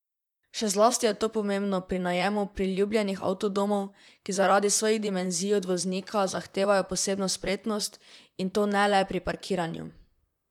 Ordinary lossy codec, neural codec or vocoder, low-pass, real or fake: none; vocoder, 44.1 kHz, 128 mel bands, Pupu-Vocoder; 19.8 kHz; fake